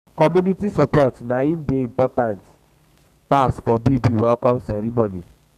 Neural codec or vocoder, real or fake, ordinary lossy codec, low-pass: codec, 32 kHz, 1.9 kbps, SNAC; fake; none; 14.4 kHz